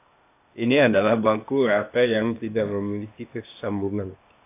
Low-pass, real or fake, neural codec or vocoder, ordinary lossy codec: 3.6 kHz; fake; codec, 16 kHz, 0.8 kbps, ZipCodec; AAC, 24 kbps